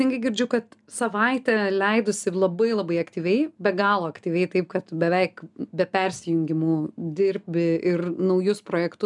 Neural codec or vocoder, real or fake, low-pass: none; real; 10.8 kHz